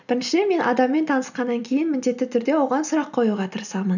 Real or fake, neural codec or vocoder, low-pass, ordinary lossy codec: real; none; 7.2 kHz; none